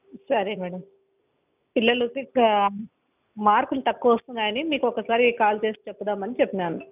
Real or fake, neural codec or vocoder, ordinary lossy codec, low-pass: real; none; none; 3.6 kHz